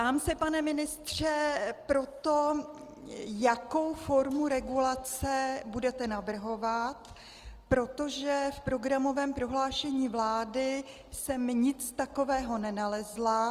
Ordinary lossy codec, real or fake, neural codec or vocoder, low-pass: Opus, 24 kbps; real; none; 14.4 kHz